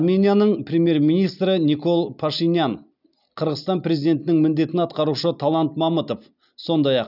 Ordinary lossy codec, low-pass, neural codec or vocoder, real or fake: none; 5.4 kHz; none; real